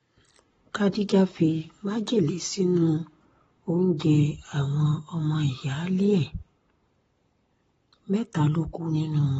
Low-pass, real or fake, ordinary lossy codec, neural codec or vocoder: 19.8 kHz; fake; AAC, 24 kbps; codec, 44.1 kHz, 7.8 kbps, Pupu-Codec